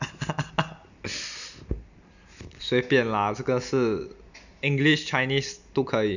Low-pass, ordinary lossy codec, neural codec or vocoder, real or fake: 7.2 kHz; none; none; real